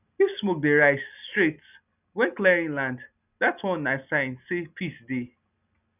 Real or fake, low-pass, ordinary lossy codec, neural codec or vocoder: real; 3.6 kHz; none; none